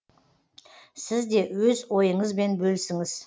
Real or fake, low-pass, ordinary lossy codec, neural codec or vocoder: real; none; none; none